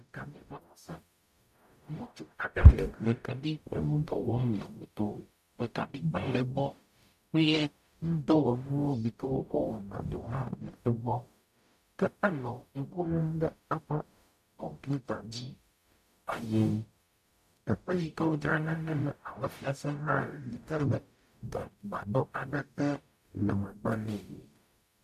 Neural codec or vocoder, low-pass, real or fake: codec, 44.1 kHz, 0.9 kbps, DAC; 14.4 kHz; fake